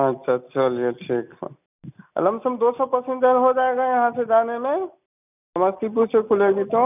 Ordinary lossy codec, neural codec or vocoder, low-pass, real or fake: none; none; 3.6 kHz; real